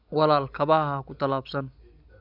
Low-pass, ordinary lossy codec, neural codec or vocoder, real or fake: 5.4 kHz; none; none; real